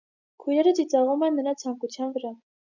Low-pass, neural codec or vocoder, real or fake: 7.2 kHz; none; real